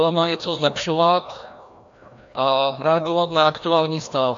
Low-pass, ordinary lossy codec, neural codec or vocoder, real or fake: 7.2 kHz; AAC, 64 kbps; codec, 16 kHz, 1 kbps, FreqCodec, larger model; fake